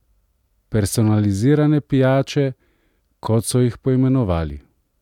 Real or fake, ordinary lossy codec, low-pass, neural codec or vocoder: real; none; 19.8 kHz; none